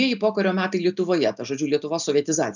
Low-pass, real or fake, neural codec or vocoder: 7.2 kHz; real; none